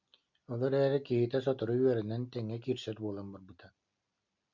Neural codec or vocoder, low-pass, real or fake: none; 7.2 kHz; real